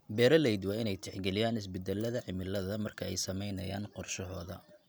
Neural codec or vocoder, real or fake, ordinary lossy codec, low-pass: none; real; none; none